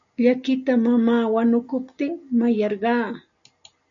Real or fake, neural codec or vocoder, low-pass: real; none; 7.2 kHz